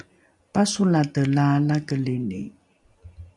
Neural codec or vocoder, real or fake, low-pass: none; real; 10.8 kHz